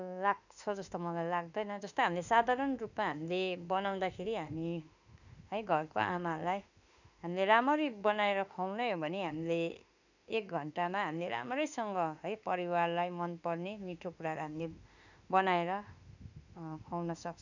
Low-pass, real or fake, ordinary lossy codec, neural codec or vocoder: 7.2 kHz; fake; none; autoencoder, 48 kHz, 32 numbers a frame, DAC-VAE, trained on Japanese speech